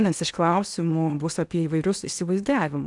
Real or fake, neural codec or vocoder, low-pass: fake; codec, 16 kHz in and 24 kHz out, 0.8 kbps, FocalCodec, streaming, 65536 codes; 10.8 kHz